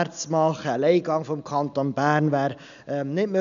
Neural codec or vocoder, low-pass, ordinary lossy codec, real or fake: none; 7.2 kHz; none; real